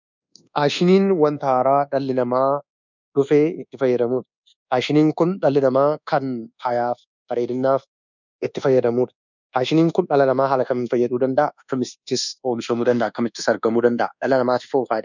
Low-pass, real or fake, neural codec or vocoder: 7.2 kHz; fake; codec, 24 kHz, 1.2 kbps, DualCodec